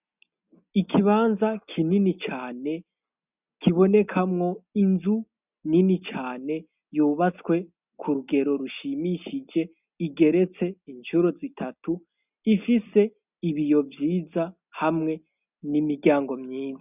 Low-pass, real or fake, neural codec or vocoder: 3.6 kHz; real; none